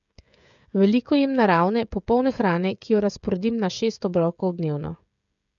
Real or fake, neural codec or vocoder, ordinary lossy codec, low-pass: fake; codec, 16 kHz, 16 kbps, FreqCodec, smaller model; none; 7.2 kHz